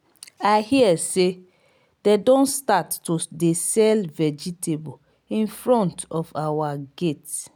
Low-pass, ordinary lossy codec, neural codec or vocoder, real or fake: none; none; none; real